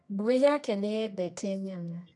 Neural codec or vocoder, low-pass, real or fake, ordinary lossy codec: codec, 24 kHz, 0.9 kbps, WavTokenizer, medium music audio release; 10.8 kHz; fake; none